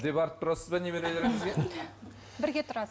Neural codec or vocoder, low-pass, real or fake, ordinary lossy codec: none; none; real; none